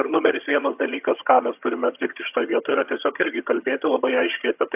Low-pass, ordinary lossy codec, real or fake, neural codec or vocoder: 3.6 kHz; AAC, 32 kbps; fake; vocoder, 22.05 kHz, 80 mel bands, HiFi-GAN